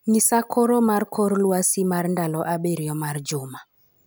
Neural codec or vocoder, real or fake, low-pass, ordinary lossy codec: none; real; none; none